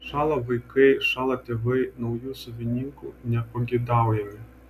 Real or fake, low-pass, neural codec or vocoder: real; 14.4 kHz; none